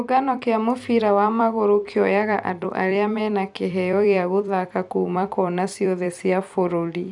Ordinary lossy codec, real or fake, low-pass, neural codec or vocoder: none; fake; 10.8 kHz; vocoder, 44.1 kHz, 128 mel bands every 256 samples, BigVGAN v2